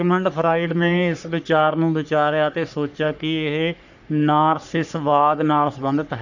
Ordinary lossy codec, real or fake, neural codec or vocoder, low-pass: none; fake; codec, 44.1 kHz, 3.4 kbps, Pupu-Codec; 7.2 kHz